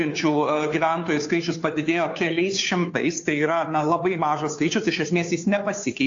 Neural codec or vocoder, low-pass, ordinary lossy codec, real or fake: codec, 16 kHz, 2 kbps, FunCodec, trained on Chinese and English, 25 frames a second; 7.2 kHz; AAC, 48 kbps; fake